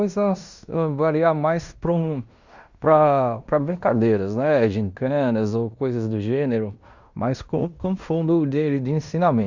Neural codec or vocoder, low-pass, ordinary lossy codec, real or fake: codec, 16 kHz in and 24 kHz out, 0.9 kbps, LongCat-Audio-Codec, fine tuned four codebook decoder; 7.2 kHz; Opus, 64 kbps; fake